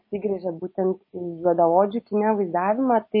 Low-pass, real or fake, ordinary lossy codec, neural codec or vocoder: 5.4 kHz; real; MP3, 24 kbps; none